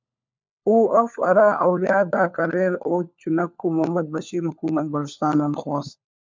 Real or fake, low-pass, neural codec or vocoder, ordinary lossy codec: fake; 7.2 kHz; codec, 16 kHz, 4 kbps, FunCodec, trained on LibriTTS, 50 frames a second; MP3, 64 kbps